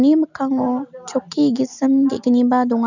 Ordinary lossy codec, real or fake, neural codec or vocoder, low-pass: none; real; none; 7.2 kHz